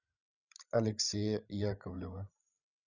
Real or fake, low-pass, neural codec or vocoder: fake; 7.2 kHz; codec, 16 kHz, 16 kbps, FreqCodec, larger model